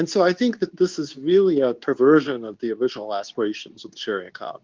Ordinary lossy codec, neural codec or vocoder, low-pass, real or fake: Opus, 32 kbps; codec, 24 kHz, 0.9 kbps, WavTokenizer, medium speech release version 2; 7.2 kHz; fake